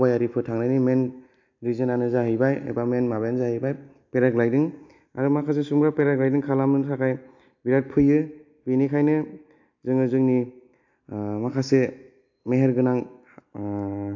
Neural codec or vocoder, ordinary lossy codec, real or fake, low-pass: none; AAC, 48 kbps; real; 7.2 kHz